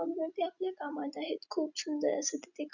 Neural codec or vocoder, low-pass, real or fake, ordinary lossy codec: none; 7.2 kHz; real; none